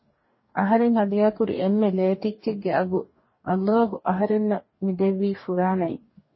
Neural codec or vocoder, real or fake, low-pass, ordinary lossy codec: codec, 44.1 kHz, 2.6 kbps, DAC; fake; 7.2 kHz; MP3, 24 kbps